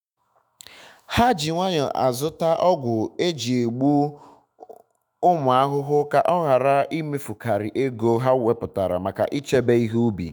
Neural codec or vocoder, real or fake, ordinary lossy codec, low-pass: autoencoder, 48 kHz, 128 numbers a frame, DAC-VAE, trained on Japanese speech; fake; none; none